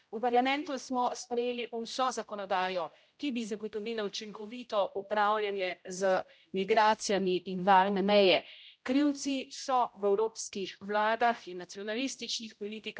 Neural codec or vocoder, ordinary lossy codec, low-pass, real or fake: codec, 16 kHz, 0.5 kbps, X-Codec, HuBERT features, trained on general audio; none; none; fake